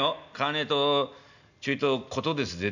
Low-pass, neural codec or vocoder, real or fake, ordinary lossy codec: 7.2 kHz; none; real; none